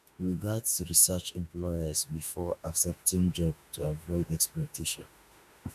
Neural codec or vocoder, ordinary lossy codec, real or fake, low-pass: autoencoder, 48 kHz, 32 numbers a frame, DAC-VAE, trained on Japanese speech; none; fake; 14.4 kHz